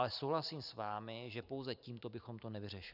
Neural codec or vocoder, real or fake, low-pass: vocoder, 44.1 kHz, 128 mel bands every 512 samples, BigVGAN v2; fake; 5.4 kHz